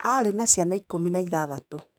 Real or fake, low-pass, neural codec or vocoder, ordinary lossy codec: fake; none; codec, 44.1 kHz, 2.6 kbps, SNAC; none